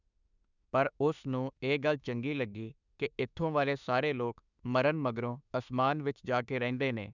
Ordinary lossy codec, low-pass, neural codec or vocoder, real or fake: none; 7.2 kHz; autoencoder, 48 kHz, 32 numbers a frame, DAC-VAE, trained on Japanese speech; fake